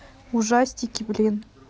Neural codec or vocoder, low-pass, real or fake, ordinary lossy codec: none; none; real; none